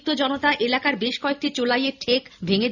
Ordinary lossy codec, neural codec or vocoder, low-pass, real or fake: none; none; 7.2 kHz; real